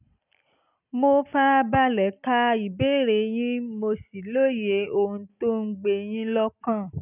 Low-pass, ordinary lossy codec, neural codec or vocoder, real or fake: 3.6 kHz; none; none; real